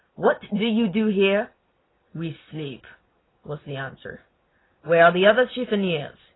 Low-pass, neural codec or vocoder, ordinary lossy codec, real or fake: 7.2 kHz; none; AAC, 16 kbps; real